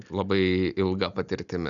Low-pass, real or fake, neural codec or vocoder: 7.2 kHz; real; none